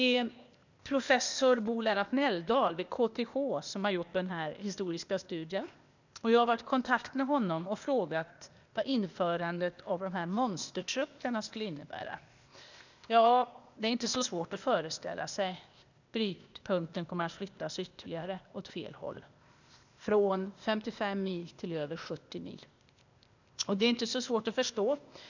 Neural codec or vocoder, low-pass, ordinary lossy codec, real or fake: codec, 16 kHz, 0.8 kbps, ZipCodec; 7.2 kHz; none; fake